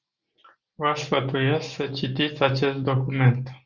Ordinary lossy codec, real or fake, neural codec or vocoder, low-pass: Opus, 64 kbps; real; none; 7.2 kHz